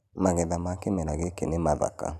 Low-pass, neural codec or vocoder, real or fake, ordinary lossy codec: 14.4 kHz; none; real; none